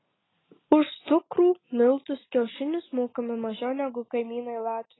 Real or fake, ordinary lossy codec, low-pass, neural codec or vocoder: real; AAC, 16 kbps; 7.2 kHz; none